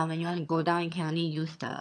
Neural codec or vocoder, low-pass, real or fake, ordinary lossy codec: vocoder, 22.05 kHz, 80 mel bands, HiFi-GAN; none; fake; none